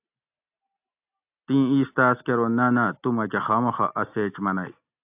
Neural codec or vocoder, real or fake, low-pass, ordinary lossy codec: none; real; 3.6 kHz; AAC, 32 kbps